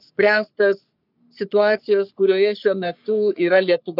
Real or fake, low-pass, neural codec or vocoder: fake; 5.4 kHz; codec, 44.1 kHz, 3.4 kbps, Pupu-Codec